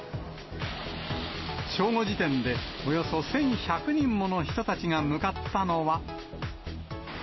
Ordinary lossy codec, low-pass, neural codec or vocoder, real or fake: MP3, 24 kbps; 7.2 kHz; none; real